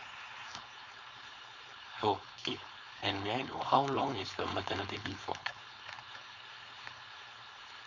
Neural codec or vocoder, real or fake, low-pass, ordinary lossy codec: codec, 16 kHz, 4.8 kbps, FACodec; fake; 7.2 kHz; none